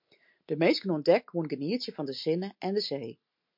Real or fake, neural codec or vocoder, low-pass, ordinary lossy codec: real; none; 5.4 kHz; MP3, 48 kbps